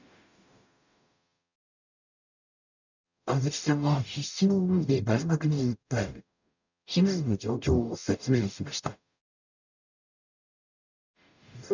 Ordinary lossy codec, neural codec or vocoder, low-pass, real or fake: none; codec, 44.1 kHz, 0.9 kbps, DAC; 7.2 kHz; fake